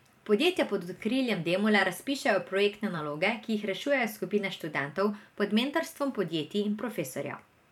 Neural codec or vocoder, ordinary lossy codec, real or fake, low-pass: vocoder, 44.1 kHz, 128 mel bands every 512 samples, BigVGAN v2; none; fake; 19.8 kHz